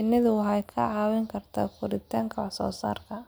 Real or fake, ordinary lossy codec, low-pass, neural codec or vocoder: real; none; none; none